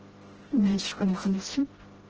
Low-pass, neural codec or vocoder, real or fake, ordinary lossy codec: 7.2 kHz; codec, 16 kHz, 0.5 kbps, FreqCodec, smaller model; fake; Opus, 16 kbps